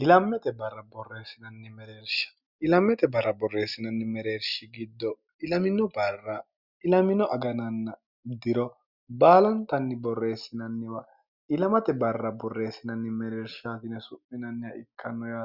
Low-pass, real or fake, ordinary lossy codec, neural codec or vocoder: 5.4 kHz; real; Opus, 64 kbps; none